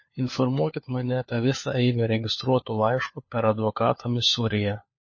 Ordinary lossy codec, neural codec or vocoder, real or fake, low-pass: MP3, 32 kbps; codec, 16 kHz, 4 kbps, FunCodec, trained on LibriTTS, 50 frames a second; fake; 7.2 kHz